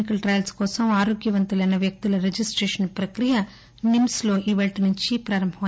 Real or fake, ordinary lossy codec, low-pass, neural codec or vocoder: real; none; none; none